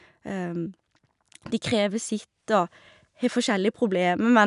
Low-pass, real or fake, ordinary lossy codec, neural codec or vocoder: 10.8 kHz; real; none; none